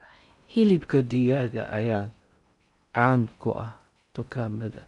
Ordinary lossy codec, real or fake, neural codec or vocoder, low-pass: none; fake; codec, 16 kHz in and 24 kHz out, 0.6 kbps, FocalCodec, streaming, 4096 codes; 10.8 kHz